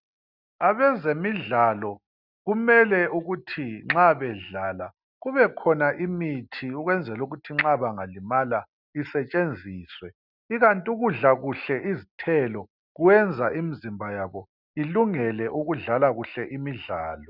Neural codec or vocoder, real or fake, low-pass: none; real; 5.4 kHz